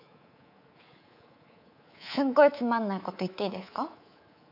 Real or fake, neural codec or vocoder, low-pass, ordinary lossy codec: fake; codec, 24 kHz, 3.1 kbps, DualCodec; 5.4 kHz; AAC, 48 kbps